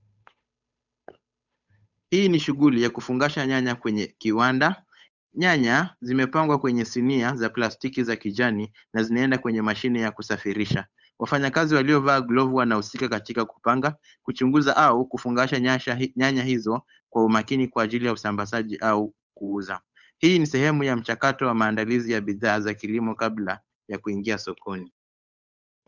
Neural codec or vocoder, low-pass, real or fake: codec, 16 kHz, 8 kbps, FunCodec, trained on Chinese and English, 25 frames a second; 7.2 kHz; fake